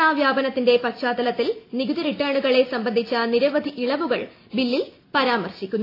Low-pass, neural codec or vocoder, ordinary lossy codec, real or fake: 5.4 kHz; none; AAC, 32 kbps; real